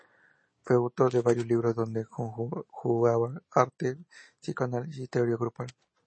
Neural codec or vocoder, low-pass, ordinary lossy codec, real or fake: none; 9.9 kHz; MP3, 32 kbps; real